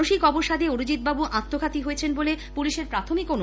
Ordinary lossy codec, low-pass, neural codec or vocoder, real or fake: none; none; none; real